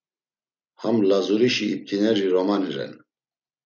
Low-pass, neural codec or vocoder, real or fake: 7.2 kHz; none; real